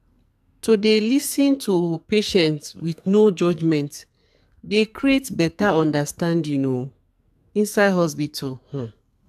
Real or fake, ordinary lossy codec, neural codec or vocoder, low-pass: fake; AAC, 96 kbps; codec, 44.1 kHz, 2.6 kbps, SNAC; 14.4 kHz